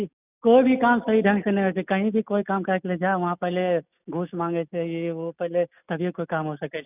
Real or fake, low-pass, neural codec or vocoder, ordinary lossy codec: real; 3.6 kHz; none; none